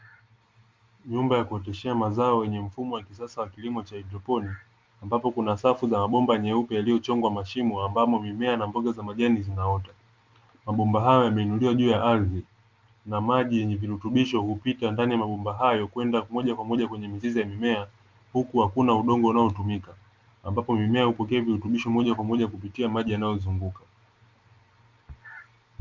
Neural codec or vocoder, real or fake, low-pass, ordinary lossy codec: none; real; 7.2 kHz; Opus, 32 kbps